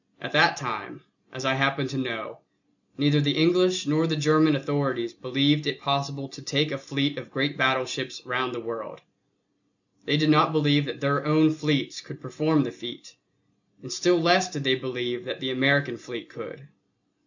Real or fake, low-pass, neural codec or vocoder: real; 7.2 kHz; none